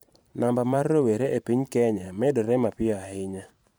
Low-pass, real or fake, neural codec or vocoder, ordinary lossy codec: none; fake; vocoder, 44.1 kHz, 128 mel bands every 512 samples, BigVGAN v2; none